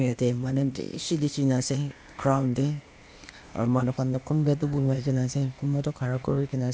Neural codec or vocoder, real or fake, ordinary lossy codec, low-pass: codec, 16 kHz, 0.8 kbps, ZipCodec; fake; none; none